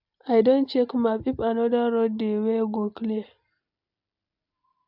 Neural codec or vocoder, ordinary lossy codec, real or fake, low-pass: none; MP3, 48 kbps; real; 5.4 kHz